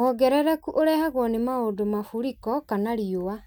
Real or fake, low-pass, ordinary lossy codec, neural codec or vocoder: real; none; none; none